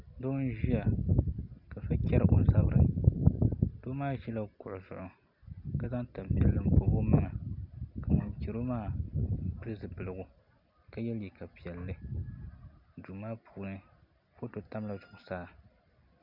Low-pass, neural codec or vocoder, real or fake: 5.4 kHz; none; real